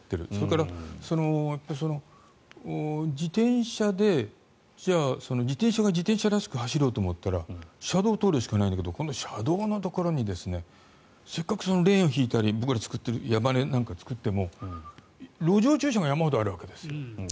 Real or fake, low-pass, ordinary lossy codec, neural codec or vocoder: real; none; none; none